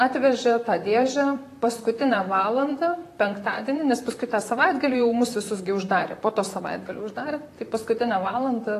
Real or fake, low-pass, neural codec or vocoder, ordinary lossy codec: fake; 14.4 kHz; vocoder, 44.1 kHz, 128 mel bands, Pupu-Vocoder; AAC, 48 kbps